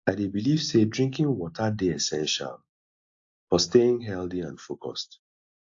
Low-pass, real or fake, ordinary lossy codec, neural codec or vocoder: 7.2 kHz; real; AAC, 48 kbps; none